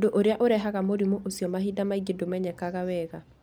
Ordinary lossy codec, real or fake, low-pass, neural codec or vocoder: none; real; none; none